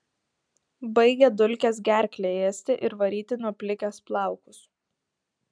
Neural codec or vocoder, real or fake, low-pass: none; real; 9.9 kHz